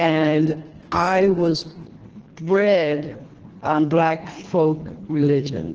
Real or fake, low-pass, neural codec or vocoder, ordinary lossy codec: fake; 7.2 kHz; codec, 24 kHz, 1.5 kbps, HILCodec; Opus, 16 kbps